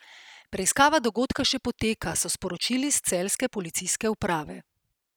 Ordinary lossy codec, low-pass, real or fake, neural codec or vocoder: none; none; real; none